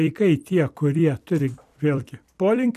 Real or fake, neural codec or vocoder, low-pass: fake; vocoder, 44.1 kHz, 128 mel bands every 256 samples, BigVGAN v2; 14.4 kHz